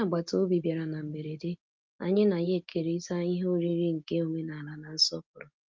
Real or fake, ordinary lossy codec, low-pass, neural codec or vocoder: real; Opus, 24 kbps; 7.2 kHz; none